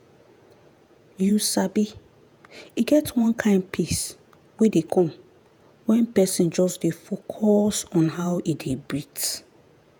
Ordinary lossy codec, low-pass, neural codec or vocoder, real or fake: none; none; vocoder, 48 kHz, 128 mel bands, Vocos; fake